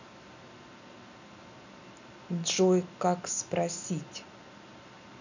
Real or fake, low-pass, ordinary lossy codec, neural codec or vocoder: real; 7.2 kHz; none; none